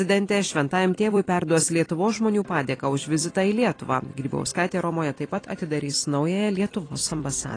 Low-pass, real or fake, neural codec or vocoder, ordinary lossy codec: 9.9 kHz; fake; vocoder, 44.1 kHz, 128 mel bands every 256 samples, BigVGAN v2; AAC, 32 kbps